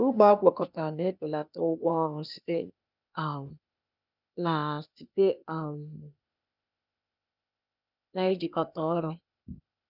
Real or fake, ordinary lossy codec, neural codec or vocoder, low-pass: fake; none; codec, 16 kHz, 0.8 kbps, ZipCodec; 5.4 kHz